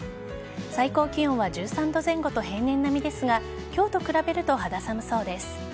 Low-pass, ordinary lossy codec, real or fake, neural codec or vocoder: none; none; real; none